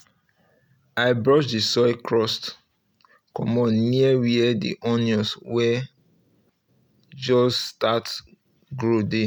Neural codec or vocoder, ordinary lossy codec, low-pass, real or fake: none; none; none; real